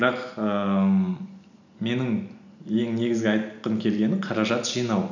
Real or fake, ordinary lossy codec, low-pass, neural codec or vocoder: real; none; 7.2 kHz; none